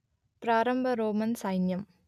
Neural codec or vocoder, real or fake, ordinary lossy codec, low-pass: none; real; none; 14.4 kHz